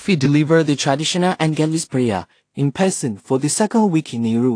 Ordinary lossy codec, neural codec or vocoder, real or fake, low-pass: AAC, 48 kbps; codec, 16 kHz in and 24 kHz out, 0.4 kbps, LongCat-Audio-Codec, two codebook decoder; fake; 9.9 kHz